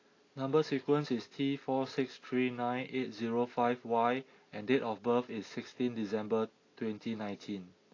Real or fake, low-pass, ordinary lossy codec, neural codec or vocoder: real; 7.2 kHz; AAC, 32 kbps; none